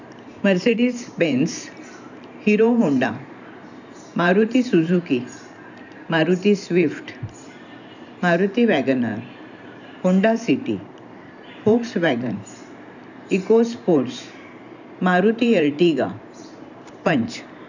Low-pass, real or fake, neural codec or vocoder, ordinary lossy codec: 7.2 kHz; fake; vocoder, 44.1 kHz, 128 mel bands every 512 samples, BigVGAN v2; none